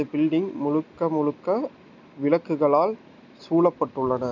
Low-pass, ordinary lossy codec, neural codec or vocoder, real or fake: 7.2 kHz; none; none; real